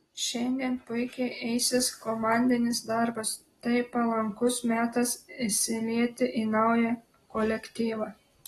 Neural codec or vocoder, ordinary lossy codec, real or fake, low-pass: none; AAC, 32 kbps; real; 19.8 kHz